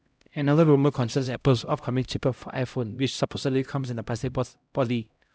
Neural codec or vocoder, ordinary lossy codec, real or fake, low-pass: codec, 16 kHz, 0.5 kbps, X-Codec, HuBERT features, trained on LibriSpeech; none; fake; none